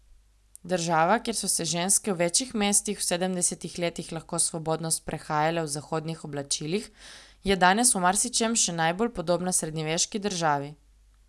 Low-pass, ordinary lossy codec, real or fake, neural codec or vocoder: none; none; real; none